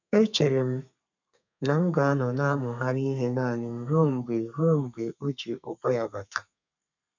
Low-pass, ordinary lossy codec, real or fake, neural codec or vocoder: 7.2 kHz; none; fake; codec, 32 kHz, 1.9 kbps, SNAC